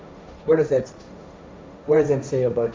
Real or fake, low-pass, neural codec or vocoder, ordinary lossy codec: fake; none; codec, 16 kHz, 1.1 kbps, Voila-Tokenizer; none